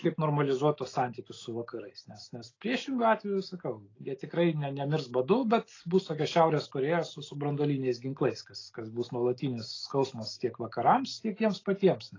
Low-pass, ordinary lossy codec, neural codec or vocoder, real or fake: 7.2 kHz; AAC, 32 kbps; none; real